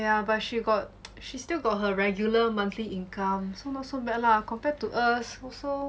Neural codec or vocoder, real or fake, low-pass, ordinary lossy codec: none; real; none; none